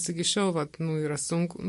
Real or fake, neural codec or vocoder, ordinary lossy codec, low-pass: real; none; MP3, 48 kbps; 14.4 kHz